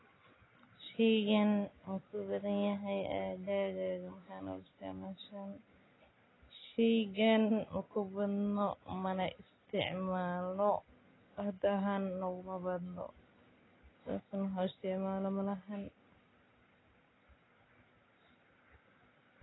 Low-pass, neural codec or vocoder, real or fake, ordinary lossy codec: 7.2 kHz; none; real; AAC, 16 kbps